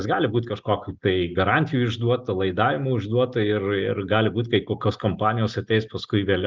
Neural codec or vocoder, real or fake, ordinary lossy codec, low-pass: none; real; Opus, 24 kbps; 7.2 kHz